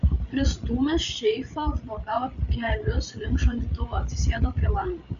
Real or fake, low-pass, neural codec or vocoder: fake; 7.2 kHz; codec, 16 kHz, 8 kbps, FreqCodec, larger model